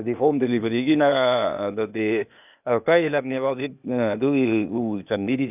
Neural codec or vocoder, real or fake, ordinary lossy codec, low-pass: codec, 16 kHz, 0.8 kbps, ZipCodec; fake; none; 3.6 kHz